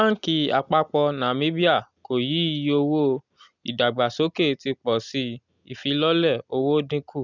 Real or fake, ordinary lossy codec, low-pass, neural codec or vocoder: real; none; 7.2 kHz; none